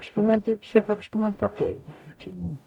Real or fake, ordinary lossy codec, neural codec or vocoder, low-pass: fake; none; codec, 44.1 kHz, 0.9 kbps, DAC; 19.8 kHz